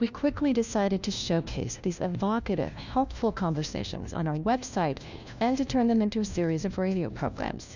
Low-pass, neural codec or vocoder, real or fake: 7.2 kHz; codec, 16 kHz, 1 kbps, FunCodec, trained on LibriTTS, 50 frames a second; fake